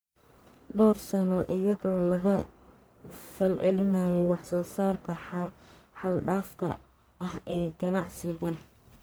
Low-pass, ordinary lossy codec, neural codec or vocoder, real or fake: none; none; codec, 44.1 kHz, 1.7 kbps, Pupu-Codec; fake